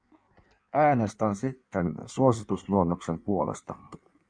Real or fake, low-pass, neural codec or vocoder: fake; 9.9 kHz; codec, 16 kHz in and 24 kHz out, 1.1 kbps, FireRedTTS-2 codec